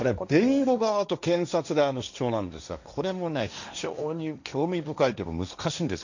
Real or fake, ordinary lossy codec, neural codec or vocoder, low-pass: fake; none; codec, 16 kHz, 1.1 kbps, Voila-Tokenizer; 7.2 kHz